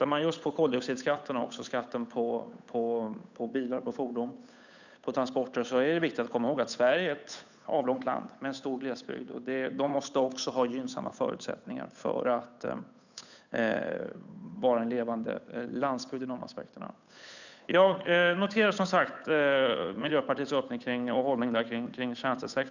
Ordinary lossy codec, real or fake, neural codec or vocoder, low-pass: none; fake; codec, 16 kHz, 8 kbps, FunCodec, trained on Chinese and English, 25 frames a second; 7.2 kHz